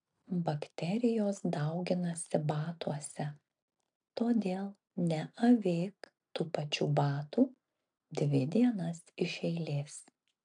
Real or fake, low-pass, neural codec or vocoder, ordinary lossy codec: real; 9.9 kHz; none; AAC, 64 kbps